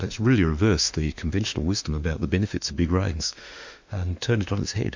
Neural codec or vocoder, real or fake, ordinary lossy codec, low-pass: autoencoder, 48 kHz, 32 numbers a frame, DAC-VAE, trained on Japanese speech; fake; MP3, 64 kbps; 7.2 kHz